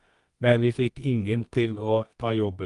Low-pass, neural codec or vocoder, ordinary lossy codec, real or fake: 10.8 kHz; codec, 24 kHz, 0.9 kbps, WavTokenizer, medium music audio release; Opus, 32 kbps; fake